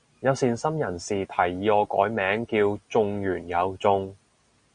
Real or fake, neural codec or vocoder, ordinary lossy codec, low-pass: real; none; Opus, 64 kbps; 9.9 kHz